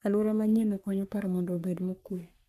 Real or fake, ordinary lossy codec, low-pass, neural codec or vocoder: fake; none; 14.4 kHz; codec, 44.1 kHz, 3.4 kbps, Pupu-Codec